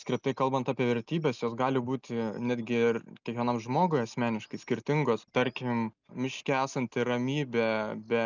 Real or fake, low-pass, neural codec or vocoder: real; 7.2 kHz; none